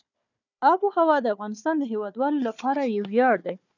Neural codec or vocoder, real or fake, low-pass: codec, 16 kHz, 4 kbps, FunCodec, trained on Chinese and English, 50 frames a second; fake; 7.2 kHz